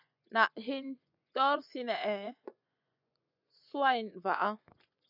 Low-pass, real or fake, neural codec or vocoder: 5.4 kHz; fake; vocoder, 24 kHz, 100 mel bands, Vocos